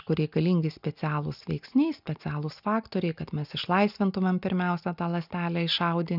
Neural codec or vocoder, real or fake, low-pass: none; real; 5.4 kHz